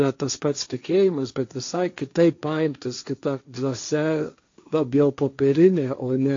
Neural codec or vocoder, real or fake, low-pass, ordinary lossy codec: codec, 16 kHz, 1.1 kbps, Voila-Tokenizer; fake; 7.2 kHz; AAC, 48 kbps